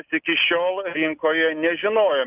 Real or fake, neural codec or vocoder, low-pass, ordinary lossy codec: real; none; 3.6 kHz; Opus, 24 kbps